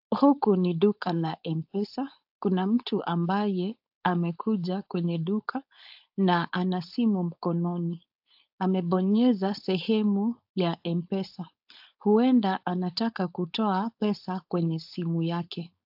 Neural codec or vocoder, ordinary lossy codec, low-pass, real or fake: codec, 16 kHz, 4.8 kbps, FACodec; AAC, 48 kbps; 5.4 kHz; fake